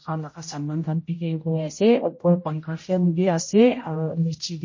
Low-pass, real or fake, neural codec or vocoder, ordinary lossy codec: 7.2 kHz; fake; codec, 16 kHz, 0.5 kbps, X-Codec, HuBERT features, trained on general audio; MP3, 32 kbps